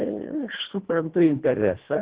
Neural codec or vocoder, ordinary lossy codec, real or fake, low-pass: codec, 24 kHz, 1.5 kbps, HILCodec; Opus, 16 kbps; fake; 3.6 kHz